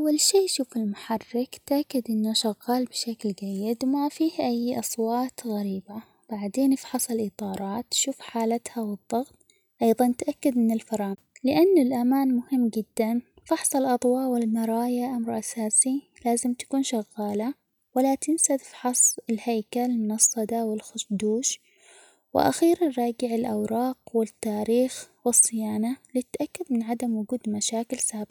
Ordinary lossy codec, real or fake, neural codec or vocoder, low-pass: none; real; none; none